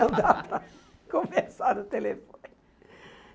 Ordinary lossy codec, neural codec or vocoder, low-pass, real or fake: none; none; none; real